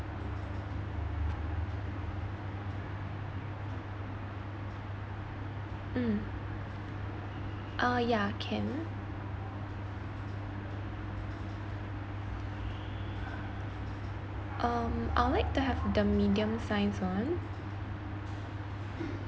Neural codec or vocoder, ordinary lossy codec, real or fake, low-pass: none; none; real; none